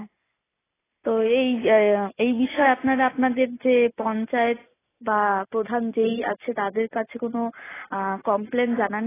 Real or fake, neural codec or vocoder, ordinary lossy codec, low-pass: real; none; AAC, 16 kbps; 3.6 kHz